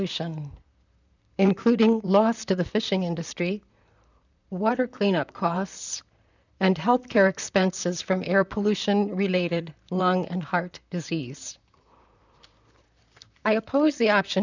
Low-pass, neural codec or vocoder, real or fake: 7.2 kHz; vocoder, 44.1 kHz, 128 mel bands, Pupu-Vocoder; fake